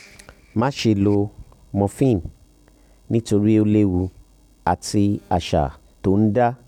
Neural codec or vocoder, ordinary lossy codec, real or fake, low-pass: none; none; real; 19.8 kHz